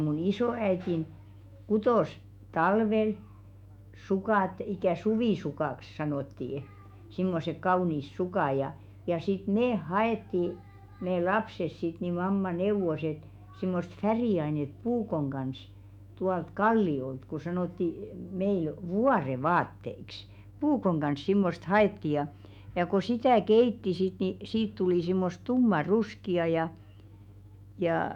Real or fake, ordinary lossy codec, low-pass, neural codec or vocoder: fake; none; 19.8 kHz; autoencoder, 48 kHz, 128 numbers a frame, DAC-VAE, trained on Japanese speech